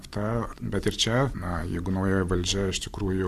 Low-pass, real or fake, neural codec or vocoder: 14.4 kHz; real; none